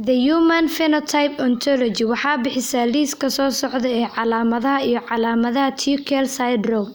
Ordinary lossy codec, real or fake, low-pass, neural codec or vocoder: none; real; none; none